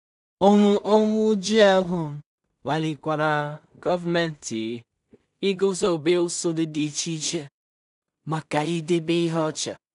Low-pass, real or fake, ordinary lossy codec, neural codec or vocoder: 10.8 kHz; fake; none; codec, 16 kHz in and 24 kHz out, 0.4 kbps, LongCat-Audio-Codec, two codebook decoder